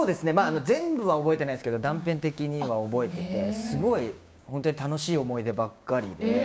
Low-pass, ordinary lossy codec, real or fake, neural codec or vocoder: none; none; fake; codec, 16 kHz, 6 kbps, DAC